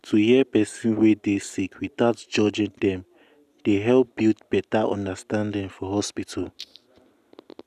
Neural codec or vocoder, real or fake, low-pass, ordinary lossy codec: none; real; 14.4 kHz; none